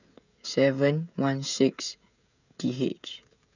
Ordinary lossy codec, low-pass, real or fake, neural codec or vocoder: none; 7.2 kHz; fake; codec, 16 kHz, 16 kbps, FreqCodec, smaller model